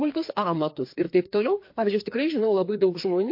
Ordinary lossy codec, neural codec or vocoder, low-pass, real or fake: MP3, 32 kbps; codec, 16 kHz, 2 kbps, FreqCodec, larger model; 5.4 kHz; fake